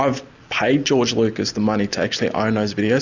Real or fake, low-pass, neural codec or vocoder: real; 7.2 kHz; none